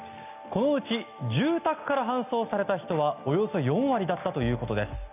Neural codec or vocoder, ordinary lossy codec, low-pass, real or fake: none; none; 3.6 kHz; real